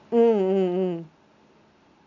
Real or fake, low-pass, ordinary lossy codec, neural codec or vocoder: real; 7.2 kHz; none; none